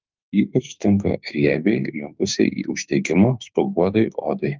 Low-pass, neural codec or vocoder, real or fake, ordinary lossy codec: 7.2 kHz; autoencoder, 48 kHz, 32 numbers a frame, DAC-VAE, trained on Japanese speech; fake; Opus, 24 kbps